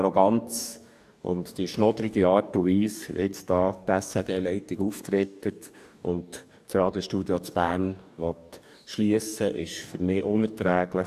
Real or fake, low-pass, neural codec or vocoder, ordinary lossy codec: fake; 14.4 kHz; codec, 44.1 kHz, 2.6 kbps, DAC; none